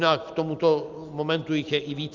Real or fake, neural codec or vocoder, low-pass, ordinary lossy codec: real; none; 7.2 kHz; Opus, 24 kbps